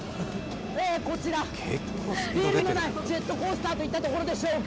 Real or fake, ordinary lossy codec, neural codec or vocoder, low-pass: real; none; none; none